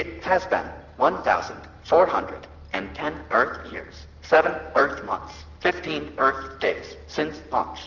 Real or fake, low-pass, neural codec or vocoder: real; 7.2 kHz; none